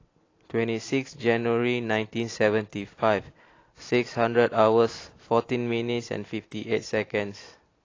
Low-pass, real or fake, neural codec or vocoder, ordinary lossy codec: 7.2 kHz; real; none; AAC, 32 kbps